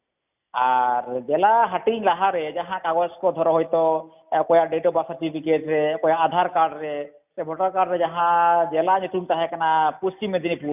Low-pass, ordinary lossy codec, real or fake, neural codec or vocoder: 3.6 kHz; none; real; none